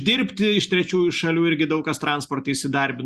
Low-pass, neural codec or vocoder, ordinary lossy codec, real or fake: 14.4 kHz; none; Opus, 64 kbps; real